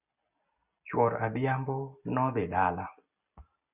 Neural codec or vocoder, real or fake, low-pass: none; real; 3.6 kHz